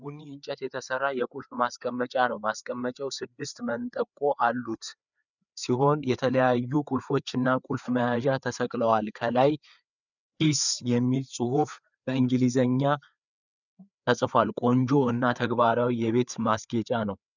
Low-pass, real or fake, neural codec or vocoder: 7.2 kHz; fake; codec, 16 kHz, 4 kbps, FreqCodec, larger model